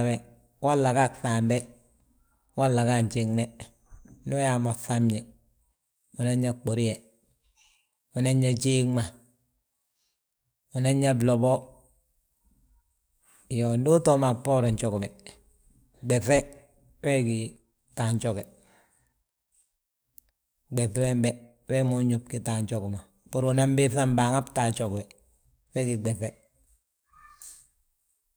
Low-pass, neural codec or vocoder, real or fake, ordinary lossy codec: none; codec, 44.1 kHz, 7.8 kbps, DAC; fake; none